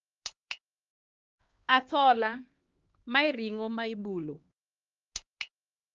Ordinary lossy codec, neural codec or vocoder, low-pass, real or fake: Opus, 32 kbps; codec, 16 kHz, 2 kbps, X-Codec, HuBERT features, trained on LibriSpeech; 7.2 kHz; fake